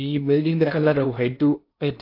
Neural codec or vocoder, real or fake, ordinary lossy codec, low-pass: codec, 16 kHz in and 24 kHz out, 0.8 kbps, FocalCodec, streaming, 65536 codes; fake; AAC, 32 kbps; 5.4 kHz